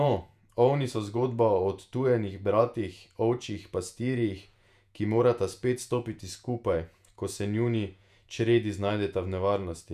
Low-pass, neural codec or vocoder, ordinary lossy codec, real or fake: 14.4 kHz; vocoder, 48 kHz, 128 mel bands, Vocos; none; fake